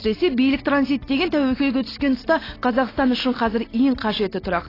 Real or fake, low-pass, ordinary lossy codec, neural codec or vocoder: real; 5.4 kHz; AAC, 24 kbps; none